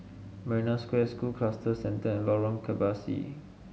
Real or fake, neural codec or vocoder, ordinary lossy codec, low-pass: real; none; none; none